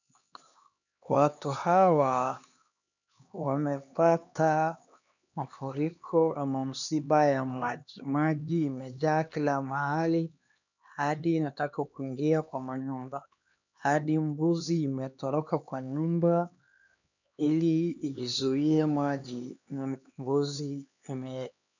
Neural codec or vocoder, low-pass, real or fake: codec, 16 kHz, 2 kbps, X-Codec, HuBERT features, trained on LibriSpeech; 7.2 kHz; fake